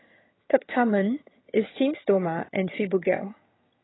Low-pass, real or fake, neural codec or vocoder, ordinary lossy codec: 7.2 kHz; fake; vocoder, 22.05 kHz, 80 mel bands, HiFi-GAN; AAC, 16 kbps